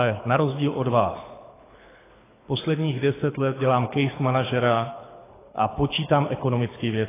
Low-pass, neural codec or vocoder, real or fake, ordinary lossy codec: 3.6 kHz; codec, 24 kHz, 6 kbps, HILCodec; fake; AAC, 16 kbps